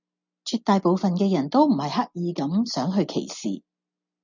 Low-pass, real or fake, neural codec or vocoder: 7.2 kHz; real; none